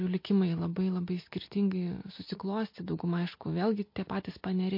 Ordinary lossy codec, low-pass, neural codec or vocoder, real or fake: MP3, 32 kbps; 5.4 kHz; none; real